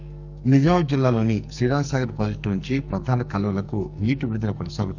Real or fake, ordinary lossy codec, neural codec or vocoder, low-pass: fake; none; codec, 44.1 kHz, 2.6 kbps, SNAC; 7.2 kHz